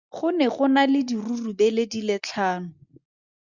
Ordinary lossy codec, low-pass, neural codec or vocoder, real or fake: Opus, 64 kbps; 7.2 kHz; none; real